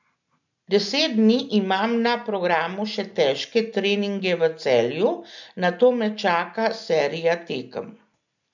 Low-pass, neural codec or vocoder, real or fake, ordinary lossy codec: 7.2 kHz; none; real; none